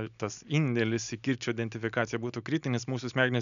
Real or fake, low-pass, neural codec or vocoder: real; 7.2 kHz; none